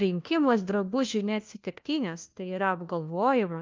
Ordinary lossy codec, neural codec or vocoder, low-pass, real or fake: Opus, 24 kbps; codec, 16 kHz, 1 kbps, FunCodec, trained on LibriTTS, 50 frames a second; 7.2 kHz; fake